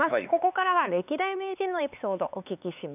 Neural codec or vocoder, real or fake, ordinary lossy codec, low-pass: codec, 16 kHz, 4 kbps, X-Codec, HuBERT features, trained on LibriSpeech; fake; none; 3.6 kHz